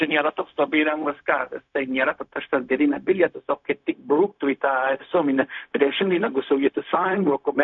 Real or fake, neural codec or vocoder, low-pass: fake; codec, 16 kHz, 0.4 kbps, LongCat-Audio-Codec; 7.2 kHz